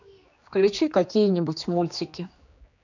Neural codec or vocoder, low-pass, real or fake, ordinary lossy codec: codec, 16 kHz, 2 kbps, X-Codec, HuBERT features, trained on general audio; 7.2 kHz; fake; none